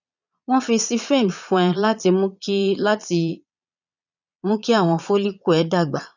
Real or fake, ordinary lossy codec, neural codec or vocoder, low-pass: fake; none; vocoder, 22.05 kHz, 80 mel bands, Vocos; 7.2 kHz